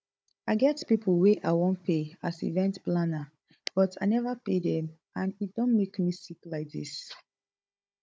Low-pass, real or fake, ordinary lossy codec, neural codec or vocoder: none; fake; none; codec, 16 kHz, 16 kbps, FunCodec, trained on Chinese and English, 50 frames a second